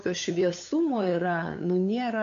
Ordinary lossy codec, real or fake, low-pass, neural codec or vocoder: MP3, 96 kbps; fake; 7.2 kHz; codec, 16 kHz, 8 kbps, FunCodec, trained on LibriTTS, 25 frames a second